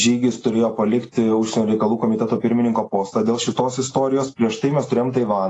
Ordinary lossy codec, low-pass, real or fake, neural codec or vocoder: AAC, 32 kbps; 10.8 kHz; real; none